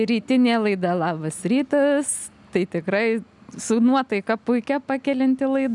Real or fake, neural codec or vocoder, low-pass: real; none; 10.8 kHz